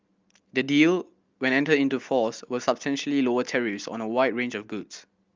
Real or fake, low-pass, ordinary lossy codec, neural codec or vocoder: real; 7.2 kHz; Opus, 24 kbps; none